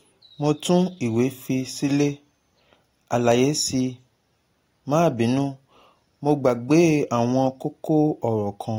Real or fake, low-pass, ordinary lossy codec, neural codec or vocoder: real; 19.8 kHz; AAC, 48 kbps; none